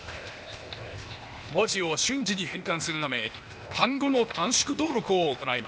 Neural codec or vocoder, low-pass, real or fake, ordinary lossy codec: codec, 16 kHz, 0.8 kbps, ZipCodec; none; fake; none